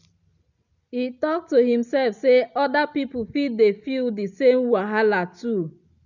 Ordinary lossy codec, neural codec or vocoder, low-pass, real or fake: none; none; 7.2 kHz; real